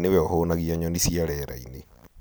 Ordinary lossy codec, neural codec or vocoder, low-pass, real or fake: none; none; none; real